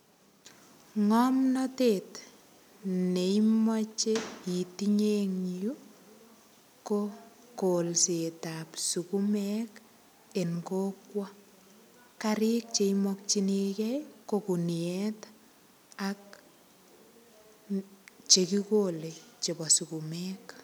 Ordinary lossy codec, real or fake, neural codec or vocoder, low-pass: none; real; none; none